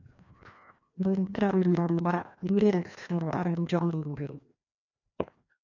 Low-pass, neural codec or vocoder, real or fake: 7.2 kHz; codec, 16 kHz, 1 kbps, FreqCodec, larger model; fake